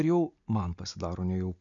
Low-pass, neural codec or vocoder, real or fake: 7.2 kHz; none; real